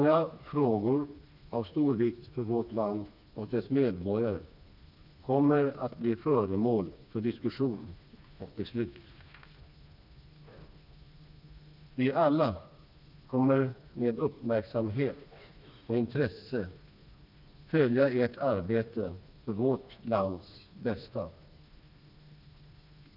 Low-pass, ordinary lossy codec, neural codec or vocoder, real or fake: 5.4 kHz; none; codec, 16 kHz, 2 kbps, FreqCodec, smaller model; fake